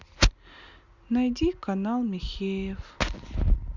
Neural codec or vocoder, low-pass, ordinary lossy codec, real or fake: none; 7.2 kHz; Opus, 64 kbps; real